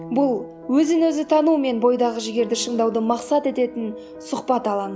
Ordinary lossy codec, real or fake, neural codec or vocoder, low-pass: none; real; none; none